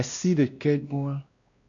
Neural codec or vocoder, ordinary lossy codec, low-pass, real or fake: codec, 16 kHz, 1 kbps, X-Codec, WavLM features, trained on Multilingual LibriSpeech; AAC, 64 kbps; 7.2 kHz; fake